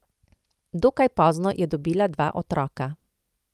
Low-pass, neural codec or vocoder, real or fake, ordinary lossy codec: 14.4 kHz; vocoder, 44.1 kHz, 128 mel bands every 256 samples, BigVGAN v2; fake; Opus, 32 kbps